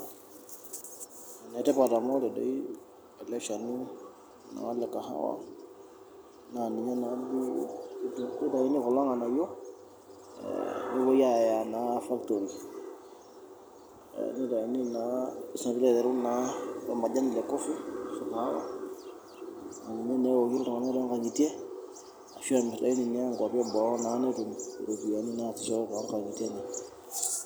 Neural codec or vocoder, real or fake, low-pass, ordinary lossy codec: none; real; none; none